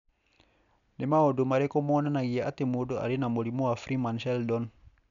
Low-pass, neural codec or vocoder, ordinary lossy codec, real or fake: 7.2 kHz; none; MP3, 96 kbps; real